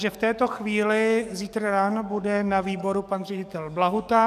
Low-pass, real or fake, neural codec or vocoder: 14.4 kHz; fake; codec, 44.1 kHz, 7.8 kbps, DAC